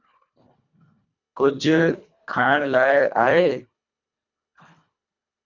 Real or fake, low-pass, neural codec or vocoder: fake; 7.2 kHz; codec, 24 kHz, 1.5 kbps, HILCodec